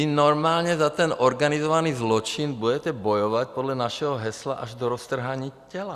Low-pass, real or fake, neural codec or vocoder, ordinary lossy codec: 14.4 kHz; real; none; Opus, 64 kbps